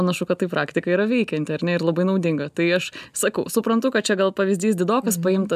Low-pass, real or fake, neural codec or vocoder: 14.4 kHz; real; none